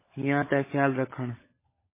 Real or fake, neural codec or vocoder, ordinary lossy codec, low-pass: fake; codec, 16 kHz, 16 kbps, FunCodec, trained on LibriTTS, 50 frames a second; MP3, 16 kbps; 3.6 kHz